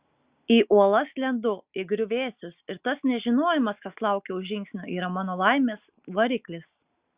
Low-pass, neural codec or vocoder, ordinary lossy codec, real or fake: 3.6 kHz; none; Opus, 64 kbps; real